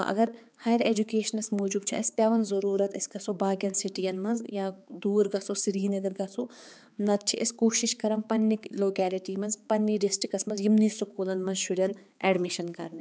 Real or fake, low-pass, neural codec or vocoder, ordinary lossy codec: fake; none; codec, 16 kHz, 4 kbps, X-Codec, HuBERT features, trained on balanced general audio; none